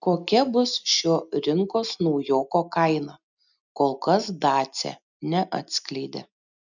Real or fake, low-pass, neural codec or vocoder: real; 7.2 kHz; none